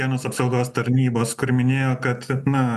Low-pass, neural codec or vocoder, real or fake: 14.4 kHz; none; real